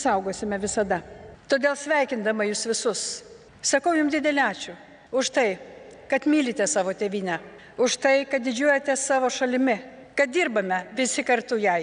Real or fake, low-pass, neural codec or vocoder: real; 9.9 kHz; none